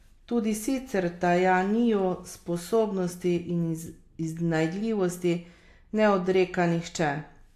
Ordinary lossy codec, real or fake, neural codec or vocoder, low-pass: AAC, 64 kbps; real; none; 14.4 kHz